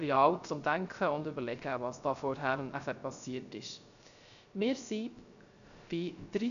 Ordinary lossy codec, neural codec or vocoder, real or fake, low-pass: none; codec, 16 kHz, 0.3 kbps, FocalCodec; fake; 7.2 kHz